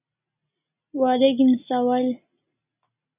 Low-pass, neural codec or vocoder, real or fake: 3.6 kHz; none; real